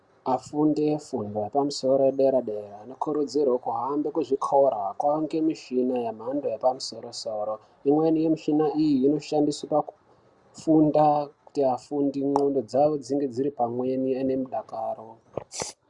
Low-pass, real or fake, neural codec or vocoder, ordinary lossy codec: 10.8 kHz; real; none; Opus, 64 kbps